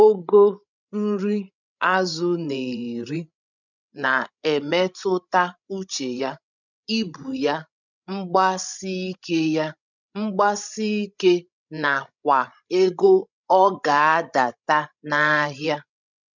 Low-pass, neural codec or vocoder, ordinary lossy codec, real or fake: 7.2 kHz; codec, 16 kHz, 16 kbps, FreqCodec, larger model; none; fake